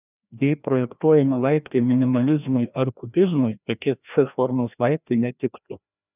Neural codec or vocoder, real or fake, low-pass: codec, 16 kHz, 1 kbps, FreqCodec, larger model; fake; 3.6 kHz